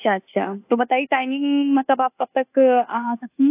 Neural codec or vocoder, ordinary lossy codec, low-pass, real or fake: codec, 24 kHz, 1.2 kbps, DualCodec; AAC, 24 kbps; 3.6 kHz; fake